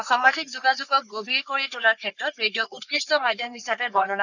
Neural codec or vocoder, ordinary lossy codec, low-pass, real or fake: codec, 44.1 kHz, 3.4 kbps, Pupu-Codec; none; 7.2 kHz; fake